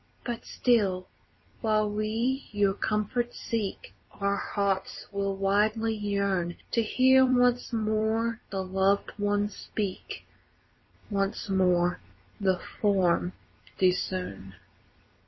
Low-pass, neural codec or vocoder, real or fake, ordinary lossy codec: 7.2 kHz; vocoder, 44.1 kHz, 128 mel bands every 256 samples, BigVGAN v2; fake; MP3, 24 kbps